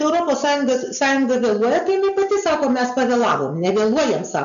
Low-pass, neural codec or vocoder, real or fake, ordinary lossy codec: 7.2 kHz; none; real; AAC, 96 kbps